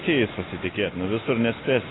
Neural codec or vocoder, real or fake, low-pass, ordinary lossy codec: none; real; 7.2 kHz; AAC, 16 kbps